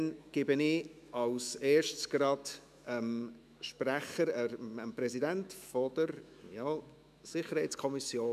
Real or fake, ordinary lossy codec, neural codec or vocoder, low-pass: fake; none; autoencoder, 48 kHz, 128 numbers a frame, DAC-VAE, trained on Japanese speech; 14.4 kHz